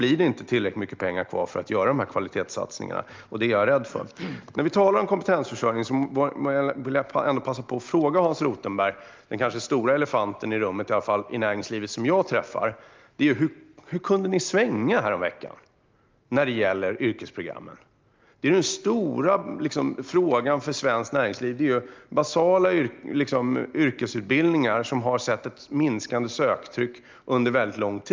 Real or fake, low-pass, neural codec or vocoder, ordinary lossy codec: real; 7.2 kHz; none; Opus, 24 kbps